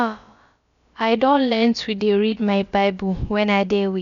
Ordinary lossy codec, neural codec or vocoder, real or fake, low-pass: none; codec, 16 kHz, about 1 kbps, DyCAST, with the encoder's durations; fake; 7.2 kHz